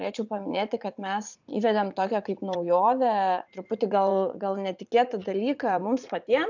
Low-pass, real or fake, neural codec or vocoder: 7.2 kHz; fake; vocoder, 44.1 kHz, 80 mel bands, Vocos